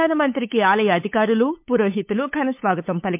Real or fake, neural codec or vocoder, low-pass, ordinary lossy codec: fake; codec, 16 kHz, 8 kbps, FunCodec, trained on LibriTTS, 25 frames a second; 3.6 kHz; MP3, 32 kbps